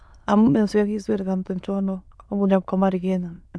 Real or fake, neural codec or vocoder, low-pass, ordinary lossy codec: fake; autoencoder, 22.05 kHz, a latent of 192 numbers a frame, VITS, trained on many speakers; none; none